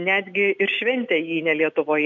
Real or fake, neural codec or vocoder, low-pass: real; none; 7.2 kHz